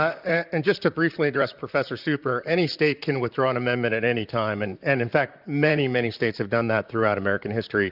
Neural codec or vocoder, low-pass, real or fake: vocoder, 44.1 kHz, 128 mel bands, Pupu-Vocoder; 5.4 kHz; fake